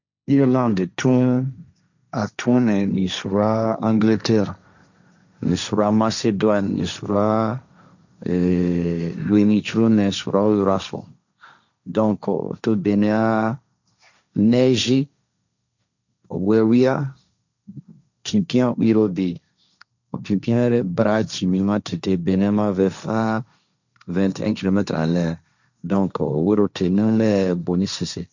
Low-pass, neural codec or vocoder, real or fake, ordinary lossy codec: 7.2 kHz; codec, 16 kHz, 1.1 kbps, Voila-Tokenizer; fake; none